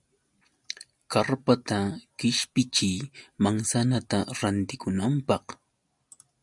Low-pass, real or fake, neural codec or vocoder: 10.8 kHz; real; none